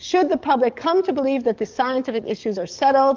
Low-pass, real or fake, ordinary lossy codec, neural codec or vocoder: 7.2 kHz; fake; Opus, 24 kbps; codec, 44.1 kHz, 7.8 kbps, DAC